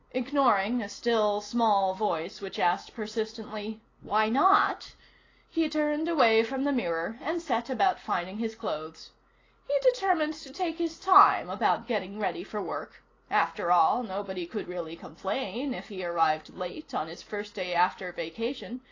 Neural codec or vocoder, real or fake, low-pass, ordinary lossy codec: none; real; 7.2 kHz; AAC, 32 kbps